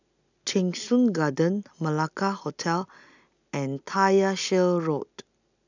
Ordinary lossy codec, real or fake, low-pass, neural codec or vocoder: none; real; 7.2 kHz; none